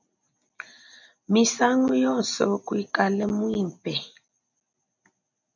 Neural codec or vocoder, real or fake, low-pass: none; real; 7.2 kHz